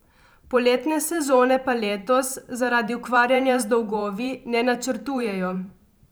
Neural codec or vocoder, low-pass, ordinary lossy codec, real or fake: vocoder, 44.1 kHz, 128 mel bands every 512 samples, BigVGAN v2; none; none; fake